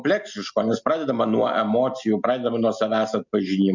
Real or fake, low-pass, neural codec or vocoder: real; 7.2 kHz; none